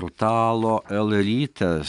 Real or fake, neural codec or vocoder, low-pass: real; none; 10.8 kHz